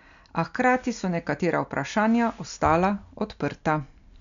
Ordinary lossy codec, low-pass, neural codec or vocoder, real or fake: none; 7.2 kHz; none; real